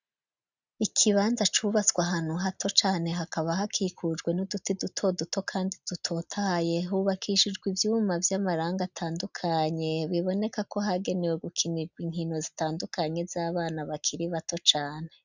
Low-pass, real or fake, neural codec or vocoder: 7.2 kHz; real; none